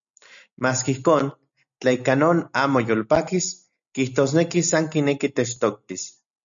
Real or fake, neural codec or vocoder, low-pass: real; none; 7.2 kHz